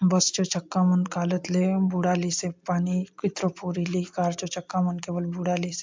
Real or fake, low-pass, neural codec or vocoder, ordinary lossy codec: real; 7.2 kHz; none; MP3, 64 kbps